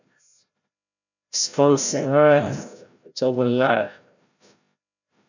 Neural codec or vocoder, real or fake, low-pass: codec, 16 kHz, 0.5 kbps, FreqCodec, larger model; fake; 7.2 kHz